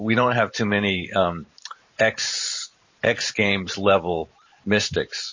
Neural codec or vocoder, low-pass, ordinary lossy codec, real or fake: vocoder, 44.1 kHz, 128 mel bands every 512 samples, BigVGAN v2; 7.2 kHz; MP3, 32 kbps; fake